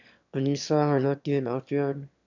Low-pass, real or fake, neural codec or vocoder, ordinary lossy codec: 7.2 kHz; fake; autoencoder, 22.05 kHz, a latent of 192 numbers a frame, VITS, trained on one speaker; none